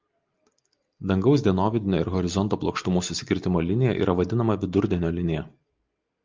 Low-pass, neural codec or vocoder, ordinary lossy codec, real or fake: 7.2 kHz; none; Opus, 32 kbps; real